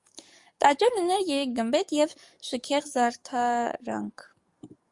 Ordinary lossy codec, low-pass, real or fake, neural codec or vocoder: Opus, 64 kbps; 10.8 kHz; fake; codec, 44.1 kHz, 7.8 kbps, DAC